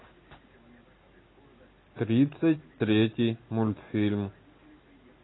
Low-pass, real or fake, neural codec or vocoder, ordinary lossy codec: 7.2 kHz; real; none; AAC, 16 kbps